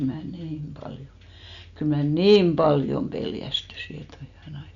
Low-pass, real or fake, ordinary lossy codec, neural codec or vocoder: 7.2 kHz; real; none; none